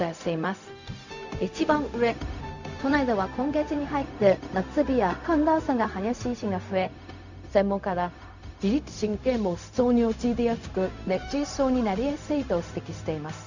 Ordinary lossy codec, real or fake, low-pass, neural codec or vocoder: none; fake; 7.2 kHz; codec, 16 kHz, 0.4 kbps, LongCat-Audio-Codec